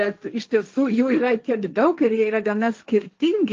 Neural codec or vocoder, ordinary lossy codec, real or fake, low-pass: codec, 16 kHz, 1.1 kbps, Voila-Tokenizer; Opus, 24 kbps; fake; 7.2 kHz